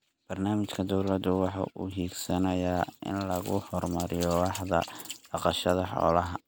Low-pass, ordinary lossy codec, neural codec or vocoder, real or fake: none; none; none; real